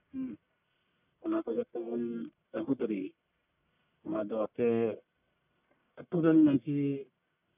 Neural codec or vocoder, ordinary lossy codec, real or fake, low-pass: codec, 44.1 kHz, 1.7 kbps, Pupu-Codec; none; fake; 3.6 kHz